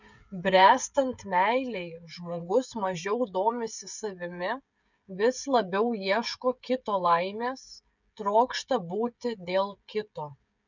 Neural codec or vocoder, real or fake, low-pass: codec, 16 kHz, 16 kbps, FreqCodec, smaller model; fake; 7.2 kHz